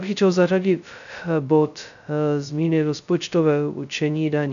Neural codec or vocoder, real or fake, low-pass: codec, 16 kHz, 0.2 kbps, FocalCodec; fake; 7.2 kHz